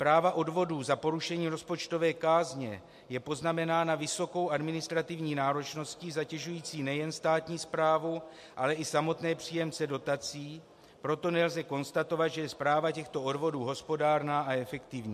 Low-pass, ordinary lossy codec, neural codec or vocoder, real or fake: 14.4 kHz; MP3, 64 kbps; none; real